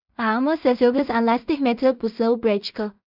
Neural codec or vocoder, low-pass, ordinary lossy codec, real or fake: codec, 16 kHz in and 24 kHz out, 0.4 kbps, LongCat-Audio-Codec, two codebook decoder; 5.4 kHz; none; fake